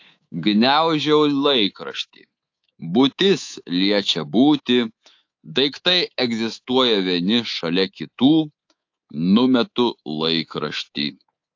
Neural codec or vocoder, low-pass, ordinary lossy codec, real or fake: codec, 24 kHz, 3.1 kbps, DualCodec; 7.2 kHz; AAC, 48 kbps; fake